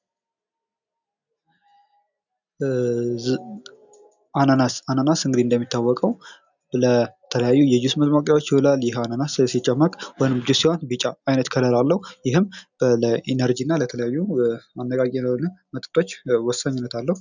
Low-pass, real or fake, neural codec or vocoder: 7.2 kHz; real; none